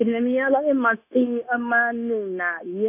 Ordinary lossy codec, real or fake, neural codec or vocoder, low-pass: none; fake; codec, 16 kHz, 0.9 kbps, LongCat-Audio-Codec; 3.6 kHz